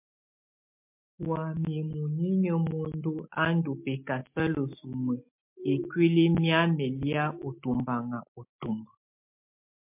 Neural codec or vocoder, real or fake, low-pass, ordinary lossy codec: none; real; 3.6 kHz; MP3, 32 kbps